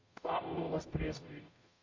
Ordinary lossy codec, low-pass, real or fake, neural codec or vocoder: MP3, 48 kbps; 7.2 kHz; fake; codec, 44.1 kHz, 0.9 kbps, DAC